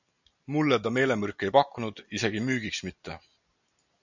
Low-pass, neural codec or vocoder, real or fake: 7.2 kHz; none; real